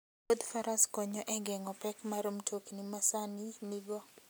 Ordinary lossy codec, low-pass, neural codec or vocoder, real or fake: none; none; none; real